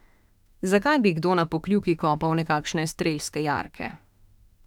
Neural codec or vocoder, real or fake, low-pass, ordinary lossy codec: autoencoder, 48 kHz, 32 numbers a frame, DAC-VAE, trained on Japanese speech; fake; 19.8 kHz; none